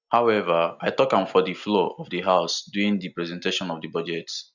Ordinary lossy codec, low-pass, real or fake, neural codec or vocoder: none; 7.2 kHz; real; none